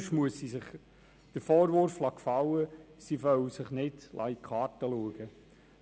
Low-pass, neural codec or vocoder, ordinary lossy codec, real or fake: none; none; none; real